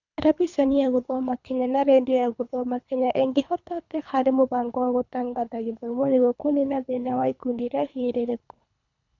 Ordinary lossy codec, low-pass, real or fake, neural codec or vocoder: none; 7.2 kHz; fake; codec, 24 kHz, 3 kbps, HILCodec